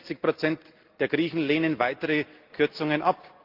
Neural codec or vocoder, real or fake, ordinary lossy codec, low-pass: none; real; Opus, 32 kbps; 5.4 kHz